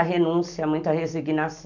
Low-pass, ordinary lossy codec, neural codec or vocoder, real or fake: 7.2 kHz; none; none; real